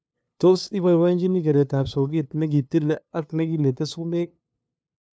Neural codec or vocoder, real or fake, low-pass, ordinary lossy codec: codec, 16 kHz, 2 kbps, FunCodec, trained on LibriTTS, 25 frames a second; fake; none; none